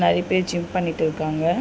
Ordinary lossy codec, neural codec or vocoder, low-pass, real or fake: none; none; none; real